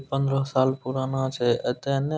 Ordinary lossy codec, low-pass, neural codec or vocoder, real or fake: none; none; none; real